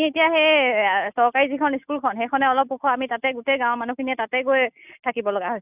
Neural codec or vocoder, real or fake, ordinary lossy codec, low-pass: none; real; none; 3.6 kHz